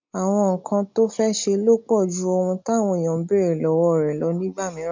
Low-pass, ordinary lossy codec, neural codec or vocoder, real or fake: 7.2 kHz; MP3, 64 kbps; none; real